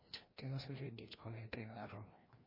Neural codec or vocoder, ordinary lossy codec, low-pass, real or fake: codec, 16 kHz, 1 kbps, FreqCodec, larger model; MP3, 24 kbps; 5.4 kHz; fake